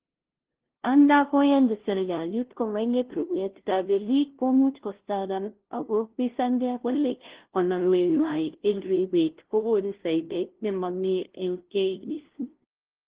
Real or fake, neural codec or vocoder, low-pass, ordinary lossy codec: fake; codec, 16 kHz, 0.5 kbps, FunCodec, trained on LibriTTS, 25 frames a second; 3.6 kHz; Opus, 16 kbps